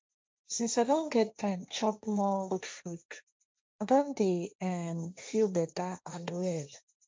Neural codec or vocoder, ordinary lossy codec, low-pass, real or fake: codec, 16 kHz, 1.1 kbps, Voila-Tokenizer; none; none; fake